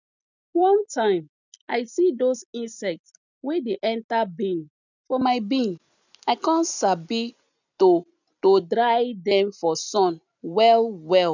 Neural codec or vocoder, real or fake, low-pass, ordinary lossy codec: none; real; 7.2 kHz; none